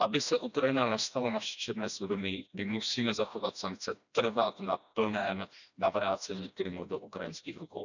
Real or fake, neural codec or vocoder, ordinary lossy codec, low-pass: fake; codec, 16 kHz, 1 kbps, FreqCodec, smaller model; none; 7.2 kHz